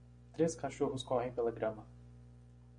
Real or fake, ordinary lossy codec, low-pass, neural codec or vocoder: real; MP3, 96 kbps; 9.9 kHz; none